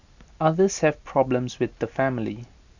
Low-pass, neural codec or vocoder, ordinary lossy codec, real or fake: 7.2 kHz; none; none; real